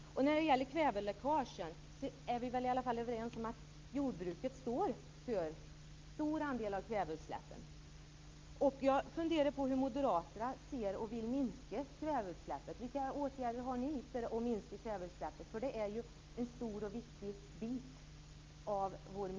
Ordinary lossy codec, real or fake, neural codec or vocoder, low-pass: Opus, 24 kbps; real; none; 7.2 kHz